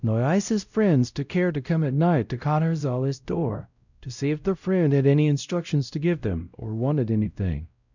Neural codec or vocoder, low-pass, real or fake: codec, 16 kHz, 0.5 kbps, X-Codec, WavLM features, trained on Multilingual LibriSpeech; 7.2 kHz; fake